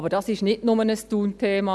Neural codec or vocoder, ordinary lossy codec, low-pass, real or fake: none; none; none; real